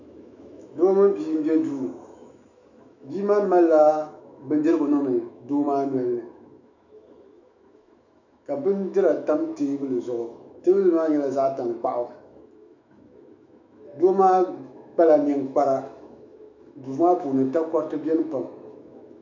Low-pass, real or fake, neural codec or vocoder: 7.2 kHz; fake; autoencoder, 48 kHz, 128 numbers a frame, DAC-VAE, trained on Japanese speech